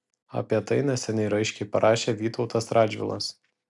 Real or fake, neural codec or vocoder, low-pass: real; none; 10.8 kHz